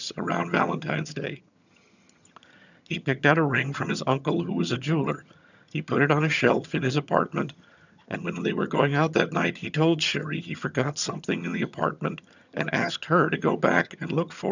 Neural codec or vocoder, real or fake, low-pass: vocoder, 22.05 kHz, 80 mel bands, HiFi-GAN; fake; 7.2 kHz